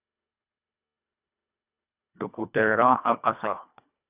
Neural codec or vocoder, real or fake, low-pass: codec, 24 kHz, 1.5 kbps, HILCodec; fake; 3.6 kHz